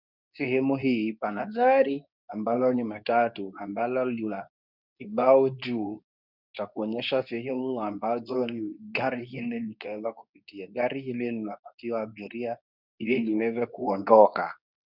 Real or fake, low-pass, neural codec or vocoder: fake; 5.4 kHz; codec, 24 kHz, 0.9 kbps, WavTokenizer, medium speech release version 2